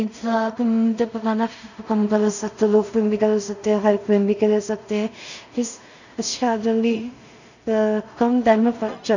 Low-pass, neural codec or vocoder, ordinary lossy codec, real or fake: 7.2 kHz; codec, 16 kHz in and 24 kHz out, 0.4 kbps, LongCat-Audio-Codec, two codebook decoder; none; fake